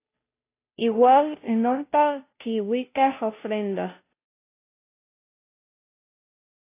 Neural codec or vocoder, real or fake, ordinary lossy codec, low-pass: codec, 16 kHz, 0.5 kbps, FunCodec, trained on Chinese and English, 25 frames a second; fake; AAC, 24 kbps; 3.6 kHz